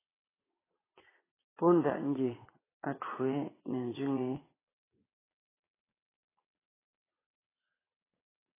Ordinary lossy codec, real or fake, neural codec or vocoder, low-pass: AAC, 16 kbps; fake; vocoder, 22.05 kHz, 80 mel bands, Vocos; 3.6 kHz